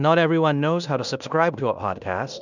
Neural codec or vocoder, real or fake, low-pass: codec, 16 kHz in and 24 kHz out, 0.9 kbps, LongCat-Audio-Codec, fine tuned four codebook decoder; fake; 7.2 kHz